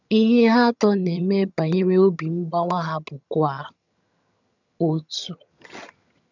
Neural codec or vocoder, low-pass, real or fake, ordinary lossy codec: vocoder, 22.05 kHz, 80 mel bands, HiFi-GAN; 7.2 kHz; fake; none